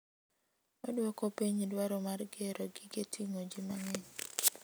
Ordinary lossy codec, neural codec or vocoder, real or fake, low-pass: none; none; real; none